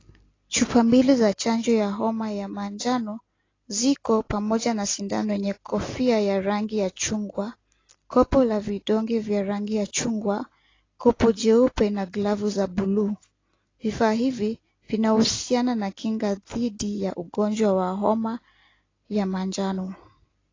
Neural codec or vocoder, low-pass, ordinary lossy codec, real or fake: none; 7.2 kHz; AAC, 32 kbps; real